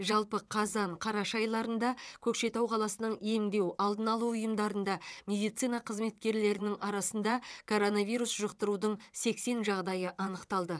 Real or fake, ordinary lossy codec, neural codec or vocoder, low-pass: fake; none; vocoder, 22.05 kHz, 80 mel bands, WaveNeXt; none